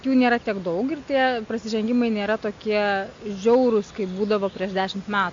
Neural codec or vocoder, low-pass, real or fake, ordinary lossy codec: none; 7.2 kHz; real; AAC, 48 kbps